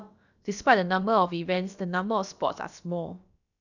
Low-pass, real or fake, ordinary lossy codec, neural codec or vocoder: 7.2 kHz; fake; none; codec, 16 kHz, about 1 kbps, DyCAST, with the encoder's durations